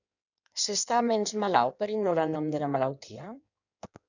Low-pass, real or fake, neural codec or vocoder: 7.2 kHz; fake; codec, 16 kHz in and 24 kHz out, 1.1 kbps, FireRedTTS-2 codec